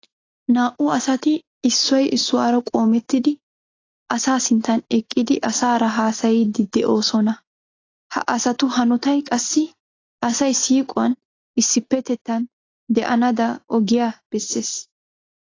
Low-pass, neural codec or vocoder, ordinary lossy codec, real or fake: 7.2 kHz; none; AAC, 32 kbps; real